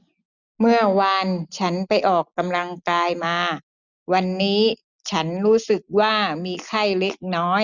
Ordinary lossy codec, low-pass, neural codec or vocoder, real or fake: none; 7.2 kHz; none; real